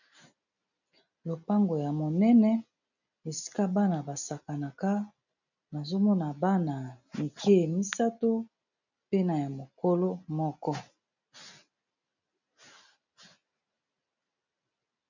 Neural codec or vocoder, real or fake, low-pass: none; real; 7.2 kHz